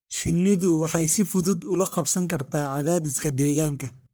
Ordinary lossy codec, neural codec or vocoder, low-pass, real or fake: none; codec, 44.1 kHz, 1.7 kbps, Pupu-Codec; none; fake